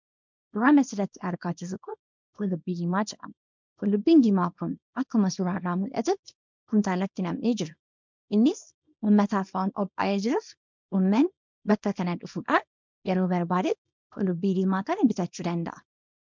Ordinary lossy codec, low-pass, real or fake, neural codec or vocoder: MP3, 64 kbps; 7.2 kHz; fake; codec, 24 kHz, 0.9 kbps, WavTokenizer, small release